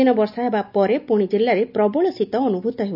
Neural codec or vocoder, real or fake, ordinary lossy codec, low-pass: none; real; none; 5.4 kHz